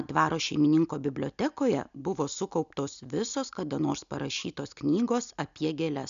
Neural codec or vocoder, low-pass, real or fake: none; 7.2 kHz; real